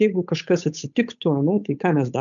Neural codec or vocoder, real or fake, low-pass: codec, 16 kHz, 8 kbps, FunCodec, trained on Chinese and English, 25 frames a second; fake; 7.2 kHz